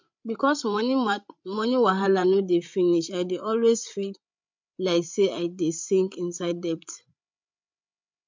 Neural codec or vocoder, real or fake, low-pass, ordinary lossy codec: codec, 16 kHz, 8 kbps, FreqCodec, larger model; fake; 7.2 kHz; MP3, 64 kbps